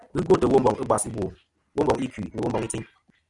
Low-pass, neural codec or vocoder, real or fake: 10.8 kHz; none; real